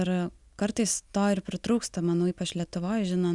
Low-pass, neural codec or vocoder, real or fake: 10.8 kHz; none; real